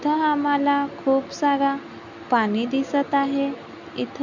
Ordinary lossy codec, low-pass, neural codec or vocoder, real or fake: none; 7.2 kHz; none; real